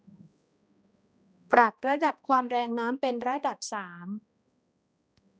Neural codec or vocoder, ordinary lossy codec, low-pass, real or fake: codec, 16 kHz, 1 kbps, X-Codec, HuBERT features, trained on balanced general audio; none; none; fake